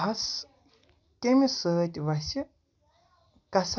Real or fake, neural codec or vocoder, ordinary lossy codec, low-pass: real; none; none; 7.2 kHz